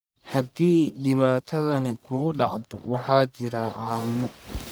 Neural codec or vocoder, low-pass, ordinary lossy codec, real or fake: codec, 44.1 kHz, 1.7 kbps, Pupu-Codec; none; none; fake